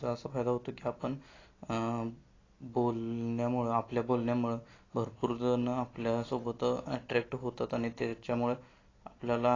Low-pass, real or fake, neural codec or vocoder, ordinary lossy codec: 7.2 kHz; real; none; AAC, 32 kbps